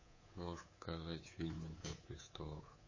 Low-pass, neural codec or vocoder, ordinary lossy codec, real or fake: 7.2 kHz; codec, 24 kHz, 3.1 kbps, DualCodec; MP3, 32 kbps; fake